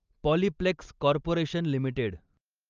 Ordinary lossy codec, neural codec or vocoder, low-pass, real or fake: Opus, 24 kbps; none; 7.2 kHz; real